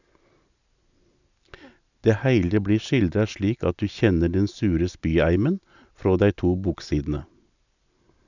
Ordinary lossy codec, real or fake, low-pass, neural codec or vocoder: none; real; 7.2 kHz; none